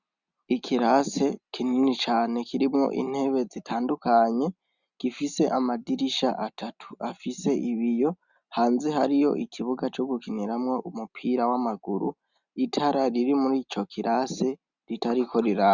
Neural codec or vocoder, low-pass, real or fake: none; 7.2 kHz; real